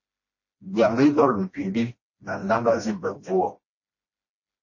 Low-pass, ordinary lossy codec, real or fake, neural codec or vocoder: 7.2 kHz; MP3, 32 kbps; fake; codec, 16 kHz, 1 kbps, FreqCodec, smaller model